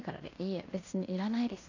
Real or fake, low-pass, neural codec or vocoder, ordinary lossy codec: fake; 7.2 kHz; codec, 16 kHz in and 24 kHz out, 0.9 kbps, LongCat-Audio-Codec, fine tuned four codebook decoder; none